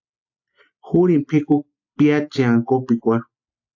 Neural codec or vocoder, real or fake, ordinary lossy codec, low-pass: none; real; AAC, 48 kbps; 7.2 kHz